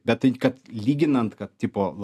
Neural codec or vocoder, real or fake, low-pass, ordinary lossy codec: none; real; 14.4 kHz; AAC, 96 kbps